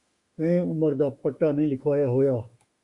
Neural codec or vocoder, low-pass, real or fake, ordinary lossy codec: autoencoder, 48 kHz, 32 numbers a frame, DAC-VAE, trained on Japanese speech; 10.8 kHz; fake; Opus, 64 kbps